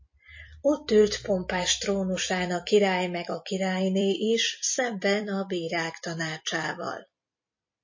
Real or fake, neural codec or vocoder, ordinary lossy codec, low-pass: fake; vocoder, 44.1 kHz, 128 mel bands every 256 samples, BigVGAN v2; MP3, 32 kbps; 7.2 kHz